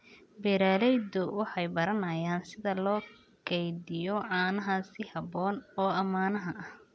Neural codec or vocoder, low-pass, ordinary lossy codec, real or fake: none; none; none; real